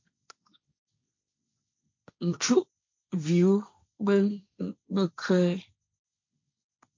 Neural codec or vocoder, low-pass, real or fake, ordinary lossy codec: codec, 16 kHz, 1.1 kbps, Voila-Tokenizer; 7.2 kHz; fake; MP3, 48 kbps